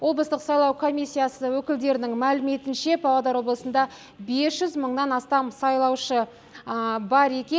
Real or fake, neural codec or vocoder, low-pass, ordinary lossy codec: real; none; none; none